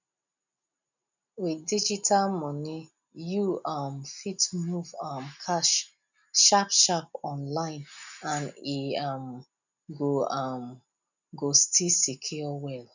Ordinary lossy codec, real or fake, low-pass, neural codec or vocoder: none; real; 7.2 kHz; none